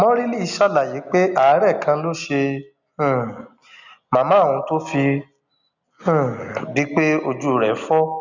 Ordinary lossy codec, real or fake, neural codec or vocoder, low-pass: none; real; none; 7.2 kHz